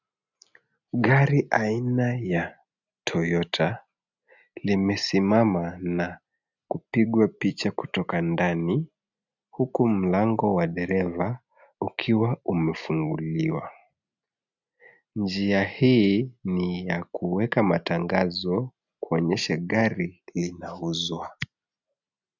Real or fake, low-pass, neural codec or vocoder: real; 7.2 kHz; none